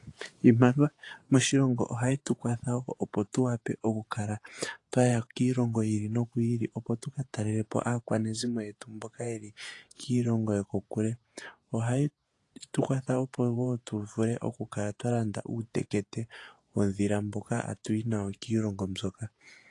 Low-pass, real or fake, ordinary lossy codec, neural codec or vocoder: 10.8 kHz; fake; AAC, 48 kbps; vocoder, 48 kHz, 128 mel bands, Vocos